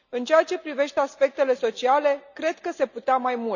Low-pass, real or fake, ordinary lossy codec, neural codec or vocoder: 7.2 kHz; real; none; none